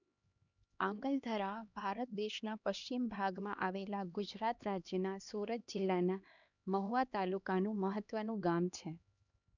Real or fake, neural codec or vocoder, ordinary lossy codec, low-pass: fake; codec, 16 kHz, 2 kbps, X-Codec, HuBERT features, trained on LibriSpeech; none; 7.2 kHz